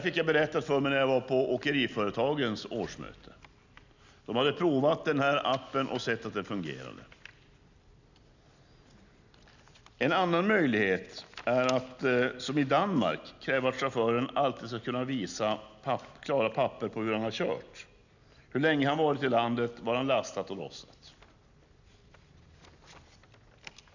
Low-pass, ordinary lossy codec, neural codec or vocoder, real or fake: 7.2 kHz; none; none; real